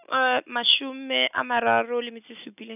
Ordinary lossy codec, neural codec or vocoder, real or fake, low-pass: none; none; real; 3.6 kHz